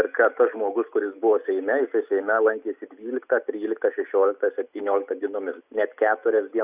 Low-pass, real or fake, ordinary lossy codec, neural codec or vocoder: 3.6 kHz; real; Opus, 64 kbps; none